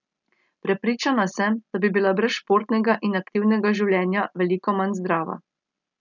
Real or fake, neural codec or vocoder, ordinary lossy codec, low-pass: real; none; none; 7.2 kHz